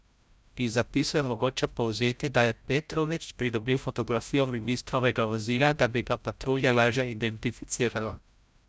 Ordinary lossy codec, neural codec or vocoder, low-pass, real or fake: none; codec, 16 kHz, 0.5 kbps, FreqCodec, larger model; none; fake